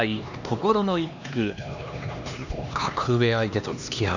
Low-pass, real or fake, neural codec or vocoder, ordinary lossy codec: 7.2 kHz; fake; codec, 16 kHz, 2 kbps, X-Codec, HuBERT features, trained on LibriSpeech; none